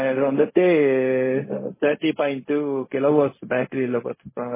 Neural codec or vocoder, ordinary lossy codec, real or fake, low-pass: codec, 16 kHz, 0.4 kbps, LongCat-Audio-Codec; MP3, 16 kbps; fake; 3.6 kHz